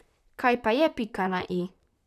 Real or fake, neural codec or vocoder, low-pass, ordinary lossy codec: fake; vocoder, 44.1 kHz, 128 mel bands, Pupu-Vocoder; 14.4 kHz; none